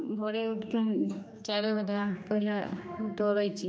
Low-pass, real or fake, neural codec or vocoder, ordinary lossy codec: none; fake; codec, 16 kHz, 2 kbps, X-Codec, HuBERT features, trained on general audio; none